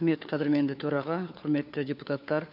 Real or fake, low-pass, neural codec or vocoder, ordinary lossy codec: fake; 5.4 kHz; codec, 16 kHz, 4 kbps, FunCodec, trained on LibriTTS, 50 frames a second; none